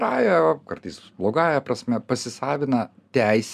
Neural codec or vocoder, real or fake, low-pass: none; real; 14.4 kHz